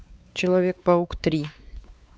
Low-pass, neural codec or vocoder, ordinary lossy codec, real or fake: none; codec, 16 kHz, 4 kbps, X-Codec, WavLM features, trained on Multilingual LibriSpeech; none; fake